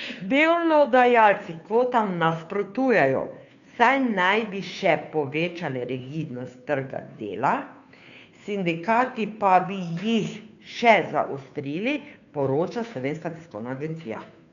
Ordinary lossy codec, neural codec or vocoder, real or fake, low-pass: none; codec, 16 kHz, 2 kbps, FunCodec, trained on Chinese and English, 25 frames a second; fake; 7.2 kHz